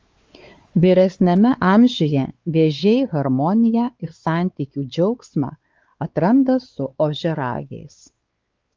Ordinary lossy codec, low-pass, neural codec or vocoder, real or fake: Opus, 32 kbps; 7.2 kHz; codec, 16 kHz, 4 kbps, X-Codec, WavLM features, trained on Multilingual LibriSpeech; fake